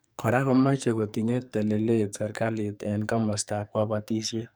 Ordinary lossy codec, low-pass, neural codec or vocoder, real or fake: none; none; codec, 44.1 kHz, 2.6 kbps, SNAC; fake